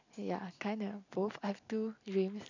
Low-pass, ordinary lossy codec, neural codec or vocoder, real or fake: 7.2 kHz; none; none; real